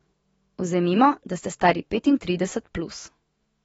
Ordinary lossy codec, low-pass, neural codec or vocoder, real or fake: AAC, 24 kbps; 19.8 kHz; autoencoder, 48 kHz, 128 numbers a frame, DAC-VAE, trained on Japanese speech; fake